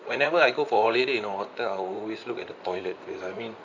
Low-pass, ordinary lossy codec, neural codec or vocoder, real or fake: 7.2 kHz; none; vocoder, 44.1 kHz, 128 mel bands, Pupu-Vocoder; fake